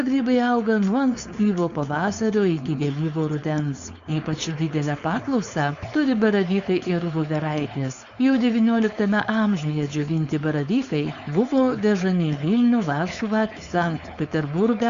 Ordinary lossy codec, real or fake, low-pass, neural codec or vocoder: Opus, 64 kbps; fake; 7.2 kHz; codec, 16 kHz, 4.8 kbps, FACodec